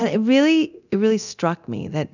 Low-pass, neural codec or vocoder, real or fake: 7.2 kHz; codec, 24 kHz, 0.9 kbps, DualCodec; fake